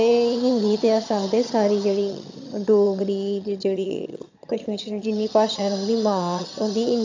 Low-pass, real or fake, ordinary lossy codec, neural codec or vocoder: 7.2 kHz; fake; AAC, 48 kbps; vocoder, 22.05 kHz, 80 mel bands, HiFi-GAN